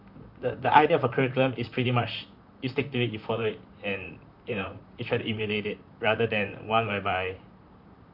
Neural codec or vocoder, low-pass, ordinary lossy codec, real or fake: vocoder, 44.1 kHz, 128 mel bands, Pupu-Vocoder; 5.4 kHz; none; fake